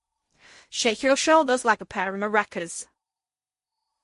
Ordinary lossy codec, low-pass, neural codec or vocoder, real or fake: MP3, 48 kbps; 10.8 kHz; codec, 16 kHz in and 24 kHz out, 0.8 kbps, FocalCodec, streaming, 65536 codes; fake